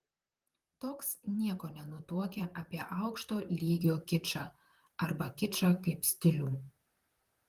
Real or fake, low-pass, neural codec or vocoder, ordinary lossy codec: fake; 14.4 kHz; vocoder, 44.1 kHz, 128 mel bands, Pupu-Vocoder; Opus, 24 kbps